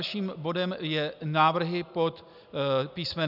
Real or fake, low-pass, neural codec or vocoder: real; 5.4 kHz; none